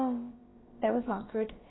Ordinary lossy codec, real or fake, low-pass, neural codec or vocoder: AAC, 16 kbps; fake; 7.2 kHz; codec, 16 kHz, about 1 kbps, DyCAST, with the encoder's durations